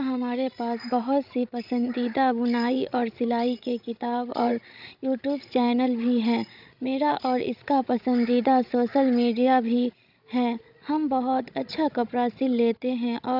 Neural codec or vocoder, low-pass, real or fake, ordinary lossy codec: codec, 16 kHz, 16 kbps, FreqCodec, larger model; 5.4 kHz; fake; none